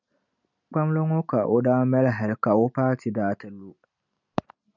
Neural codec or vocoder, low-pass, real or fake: none; 7.2 kHz; real